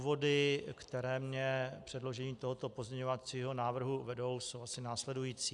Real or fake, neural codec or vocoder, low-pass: real; none; 10.8 kHz